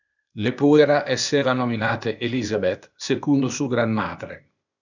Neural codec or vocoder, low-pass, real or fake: codec, 16 kHz, 0.8 kbps, ZipCodec; 7.2 kHz; fake